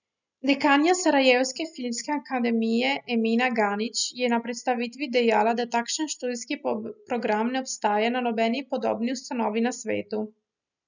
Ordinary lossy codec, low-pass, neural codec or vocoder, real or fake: none; 7.2 kHz; none; real